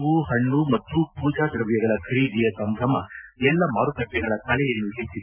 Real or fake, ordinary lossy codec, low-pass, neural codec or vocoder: real; none; 3.6 kHz; none